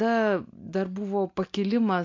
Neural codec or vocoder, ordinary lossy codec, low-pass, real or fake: none; MP3, 48 kbps; 7.2 kHz; real